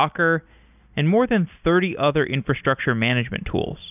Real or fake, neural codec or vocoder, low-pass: real; none; 3.6 kHz